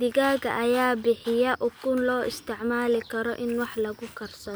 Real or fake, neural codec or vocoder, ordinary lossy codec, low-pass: real; none; none; none